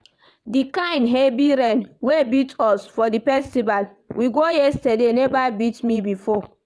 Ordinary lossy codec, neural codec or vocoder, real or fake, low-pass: none; vocoder, 22.05 kHz, 80 mel bands, WaveNeXt; fake; none